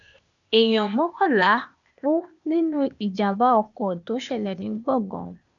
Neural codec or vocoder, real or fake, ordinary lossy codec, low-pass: codec, 16 kHz, 0.8 kbps, ZipCodec; fake; none; 7.2 kHz